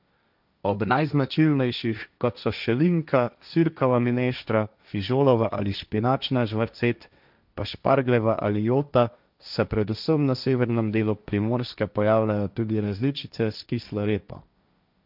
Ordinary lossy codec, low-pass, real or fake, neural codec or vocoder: none; 5.4 kHz; fake; codec, 16 kHz, 1.1 kbps, Voila-Tokenizer